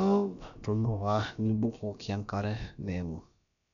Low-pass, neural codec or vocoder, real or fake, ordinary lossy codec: 7.2 kHz; codec, 16 kHz, about 1 kbps, DyCAST, with the encoder's durations; fake; none